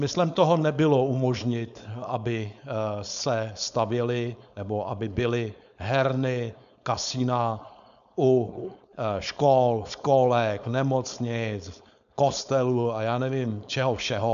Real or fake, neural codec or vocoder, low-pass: fake; codec, 16 kHz, 4.8 kbps, FACodec; 7.2 kHz